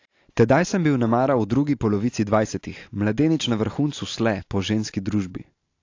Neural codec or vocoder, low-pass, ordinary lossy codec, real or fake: none; 7.2 kHz; AAC, 48 kbps; real